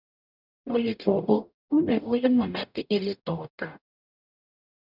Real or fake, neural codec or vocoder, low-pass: fake; codec, 44.1 kHz, 0.9 kbps, DAC; 5.4 kHz